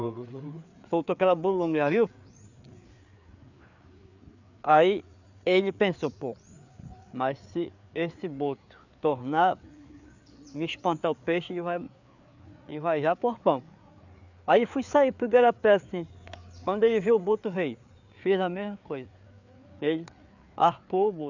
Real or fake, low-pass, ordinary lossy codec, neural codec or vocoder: fake; 7.2 kHz; none; codec, 16 kHz, 4 kbps, FreqCodec, larger model